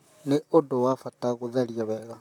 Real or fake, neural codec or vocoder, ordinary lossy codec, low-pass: fake; vocoder, 44.1 kHz, 128 mel bands, Pupu-Vocoder; none; 19.8 kHz